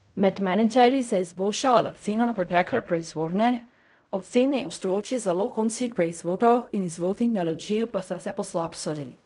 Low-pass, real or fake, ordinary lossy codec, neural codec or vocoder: 10.8 kHz; fake; none; codec, 16 kHz in and 24 kHz out, 0.4 kbps, LongCat-Audio-Codec, fine tuned four codebook decoder